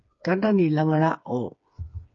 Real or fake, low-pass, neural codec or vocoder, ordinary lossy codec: fake; 7.2 kHz; codec, 16 kHz, 4 kbps, FreqCodec, smaller model; MP3, 48 kbps